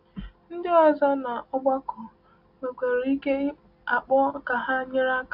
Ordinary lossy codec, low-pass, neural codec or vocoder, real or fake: AAC, 48 kbps; 5.4 kHz; none; real